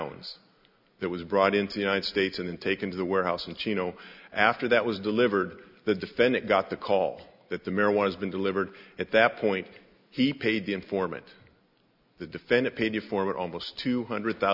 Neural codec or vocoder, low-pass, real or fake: none; 5.4 kHz; real